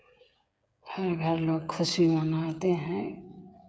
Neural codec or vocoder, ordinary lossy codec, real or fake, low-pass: codec, 16 kHz, 8 kbps, FreqCodec, smaller model; none; fake; none